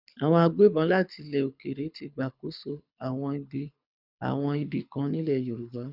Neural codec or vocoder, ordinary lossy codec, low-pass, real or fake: codec, 24 kHz, 6 kbps, HILCodec; none; 5.4 kHz; fake